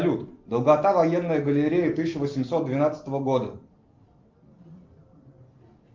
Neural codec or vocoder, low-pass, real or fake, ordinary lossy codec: none; 7.2 kHz; real; Opus, 24 kbps